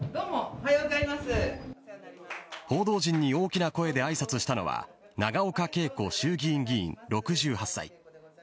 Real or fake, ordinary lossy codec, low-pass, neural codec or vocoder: real; none; none; none